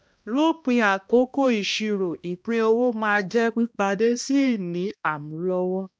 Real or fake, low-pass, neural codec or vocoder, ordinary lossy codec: fake; none; codec, 16 kHz, 1 kbps, X-Codec, HuBERT features, trained on balanced general audio; none